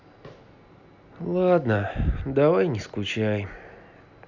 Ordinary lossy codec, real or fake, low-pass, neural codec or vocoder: none; real; 7.2 kHz; none